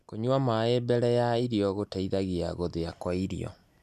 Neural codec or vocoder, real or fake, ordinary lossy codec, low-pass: none; real; none; 14.4 kHz